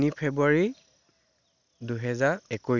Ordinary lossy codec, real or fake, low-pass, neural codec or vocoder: none; real; 7.2 kHz; none